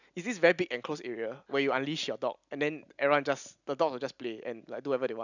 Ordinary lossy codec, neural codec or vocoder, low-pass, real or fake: none; none; 7.2 kHz; real